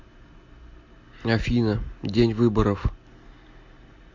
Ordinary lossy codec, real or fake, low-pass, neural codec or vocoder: MP3, 48 kbps; real; 7.2 kHz; none